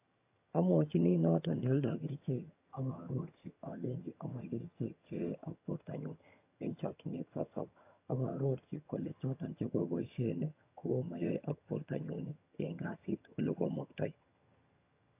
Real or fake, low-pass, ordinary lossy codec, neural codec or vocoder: fake; 3.6 kHz; AAC, 32 kbps; vocoder, 22.05 kHz, 80 mel bands, HiFi-GAN